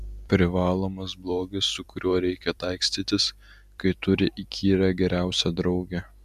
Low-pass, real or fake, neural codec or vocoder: 14.4 kHz; fake; vocoder, 44.1 kHz, 128 mel bands every 256 samples, BigVGAN v2